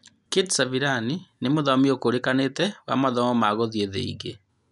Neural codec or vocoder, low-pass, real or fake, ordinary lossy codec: none; 10.8 kHz; real; none